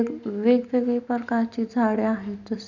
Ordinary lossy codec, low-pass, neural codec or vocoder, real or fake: none; 7.2 kHz; none; real